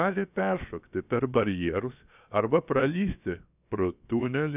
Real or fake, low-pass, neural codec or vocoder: fake; 3.6 kHz; codec, 16 kHz, 0.7 kbps, FocalCodec